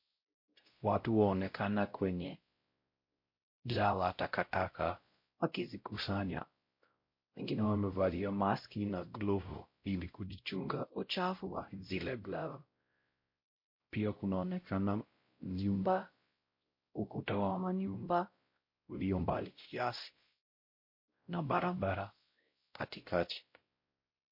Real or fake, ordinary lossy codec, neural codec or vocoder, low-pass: fake; MP3, 32 kbps; codec, 16 kHz, 0.5 kbps, X-Codec, WavLM features, trained on Multilingual LibriSpeech; 5.4 kHz